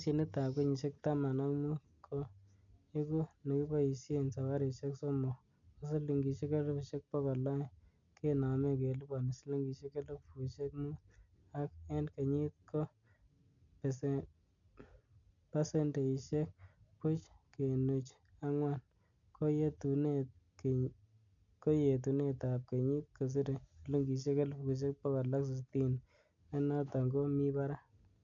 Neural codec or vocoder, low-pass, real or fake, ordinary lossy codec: none; 7.2 kHz; real; none